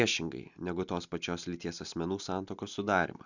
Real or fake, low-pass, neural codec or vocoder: real; 7.2 kHz; none